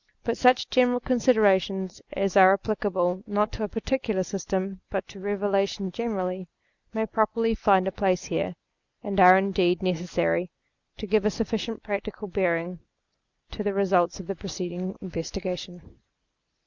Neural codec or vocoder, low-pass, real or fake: none; 7.2 kHz; real